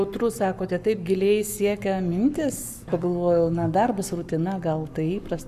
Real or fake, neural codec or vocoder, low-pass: fake; codec, 44.1 kHz, 7.8 kbps, Pupu-Codec; 14.4 kHz